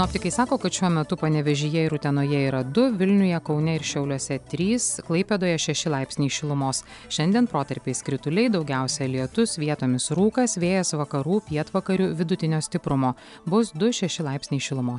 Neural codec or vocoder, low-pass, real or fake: none; 10.8 kHz; real